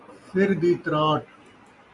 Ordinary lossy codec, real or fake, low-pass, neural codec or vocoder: MP3, 64 kbps; real; 10.8 kHz; none